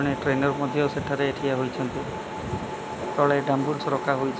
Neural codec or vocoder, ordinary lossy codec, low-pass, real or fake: none; none; none; real